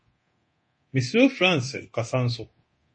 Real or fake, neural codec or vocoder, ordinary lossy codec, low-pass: fake; codec, 24 kHz, 0.9 kbps, DualCodec; MP3, 32 kbps; 9.9 kHz